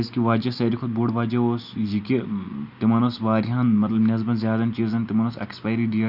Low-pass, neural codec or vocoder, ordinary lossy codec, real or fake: 5.4 kHz; none; none; real